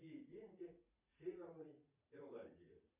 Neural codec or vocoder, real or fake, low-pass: vocoder, 44.1 kHz, 80 mel bands, Vocos; fake; 3.6 kHz